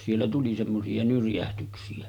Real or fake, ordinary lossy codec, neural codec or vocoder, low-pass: real; none; none; 19.8 kHz